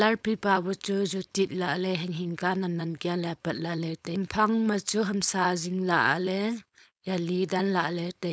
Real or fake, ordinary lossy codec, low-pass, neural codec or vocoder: fake; none; none; codec, 16 kHz, 4.8 kbps, FACodec